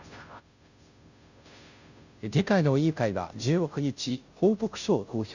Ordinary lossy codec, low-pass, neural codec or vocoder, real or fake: none; 7.2 kHz; codec, 16 kHz, 0.5 kbps, FunCodec, trained on Chinese and English, 25 frames a second; fake